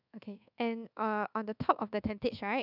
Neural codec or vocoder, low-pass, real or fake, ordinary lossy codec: codec, 24 kHz, 1.2 kbps, DualCodec; 5.4 kHz; fake; none